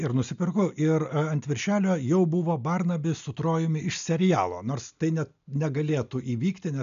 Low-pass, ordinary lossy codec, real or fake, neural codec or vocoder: 7.2 kHz; MP3, 96 kbps; real; none